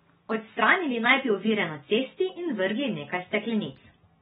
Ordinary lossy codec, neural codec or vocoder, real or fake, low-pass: AAC, 16 kbps; none; real; 19.8 kHz